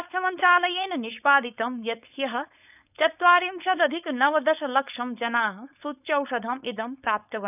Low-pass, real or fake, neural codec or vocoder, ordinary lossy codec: 3.6 kHz; fake; codec, 16 kHz, 4.8 kbps, FACodec; none